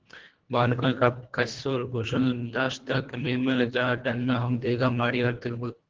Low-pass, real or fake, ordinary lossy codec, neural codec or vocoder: 7.2 kHz; fake; Opus, 16 kbps; codec, 24 kHz, 1.5 kbps, HILCodec